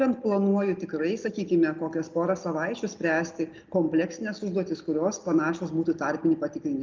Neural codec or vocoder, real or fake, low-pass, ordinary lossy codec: none; real; 7.2 kHz; Opus, 24 kbps